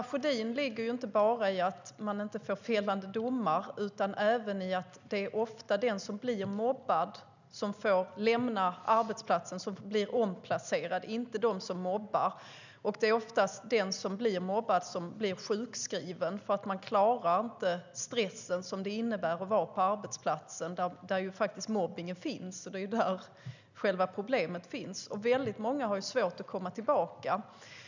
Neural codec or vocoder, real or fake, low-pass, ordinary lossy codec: none; real; 7.2 kHz; none